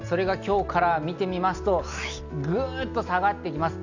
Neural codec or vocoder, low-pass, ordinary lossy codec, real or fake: none; 7.2 kHz; Opus, 64 kbps; real